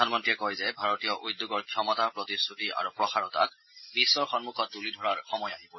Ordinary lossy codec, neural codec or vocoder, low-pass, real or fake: MP3, 24 kbps; none; 7.2 kHz; real